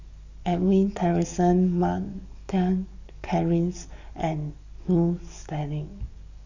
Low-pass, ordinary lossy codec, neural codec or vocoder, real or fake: 7.2 kHz; none; codec, 44.1 kHz, 7.8 kbps, DAC; fake